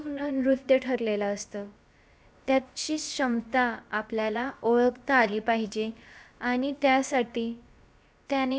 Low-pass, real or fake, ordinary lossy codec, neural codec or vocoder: none; fake; none; codec, 16 kHz, about 1 kbps, DyCAST, with the encoder's durations